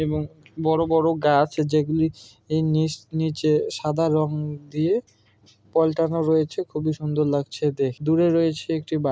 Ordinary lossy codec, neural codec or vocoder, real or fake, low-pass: none; none; real; none